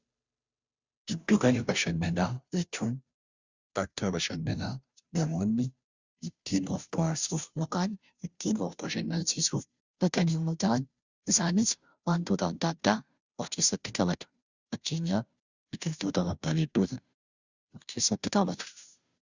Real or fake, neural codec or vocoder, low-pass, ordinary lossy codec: fake; codec, 16 kHz, 0.5 kbps, FunCodec, trained on Chinese and English, 25 frames a second; 7.2 kHz; Opus, 64 kbps